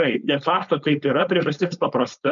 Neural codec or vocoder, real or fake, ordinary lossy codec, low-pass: codec, 16 kHz, 4.8 kbps, FACodec; fake; MP3, 96 kbps; 7.2 kHz